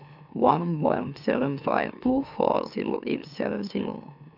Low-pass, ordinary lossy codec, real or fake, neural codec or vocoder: 5.4 kHz; none; fake; autoencoder, 44.1 kHz, a latent of 192 numbers a frame, MeloTTS